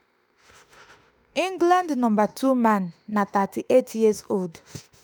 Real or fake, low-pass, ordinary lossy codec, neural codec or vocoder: fake; none; none; autoencoder, 48 kHz, 32 numbers a frame, DAC-VAE, trained on Japanese speech